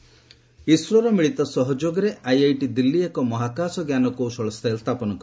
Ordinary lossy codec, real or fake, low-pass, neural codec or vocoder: none; real; none; none